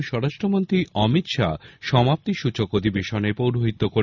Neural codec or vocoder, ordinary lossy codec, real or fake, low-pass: none; none; real; 7.2 kHz